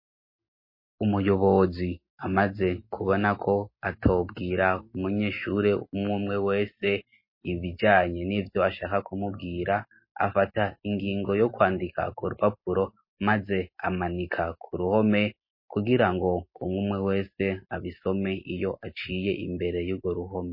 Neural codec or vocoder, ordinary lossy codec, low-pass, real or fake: none; MP3, 24 kbps; 5.4 kHz; real